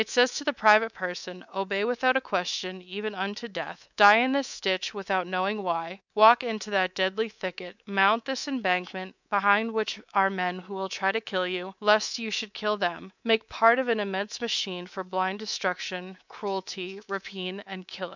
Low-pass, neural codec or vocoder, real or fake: 7.2 kHz; codec, 24 kHz, 3.1 kbps, DualCodec; fake